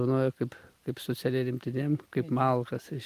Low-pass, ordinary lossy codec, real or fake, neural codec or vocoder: 14.4 kHz; Opus, 32 kbps; real; none